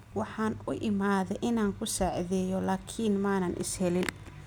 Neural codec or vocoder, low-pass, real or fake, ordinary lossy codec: none; none; real; none